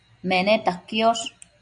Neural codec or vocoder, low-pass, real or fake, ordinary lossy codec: none; 9.9 kHz; real; MP3, 96 kbps